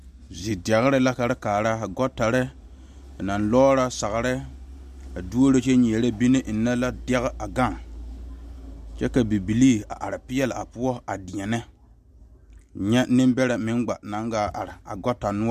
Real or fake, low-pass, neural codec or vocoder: real; 14.4 kHz; none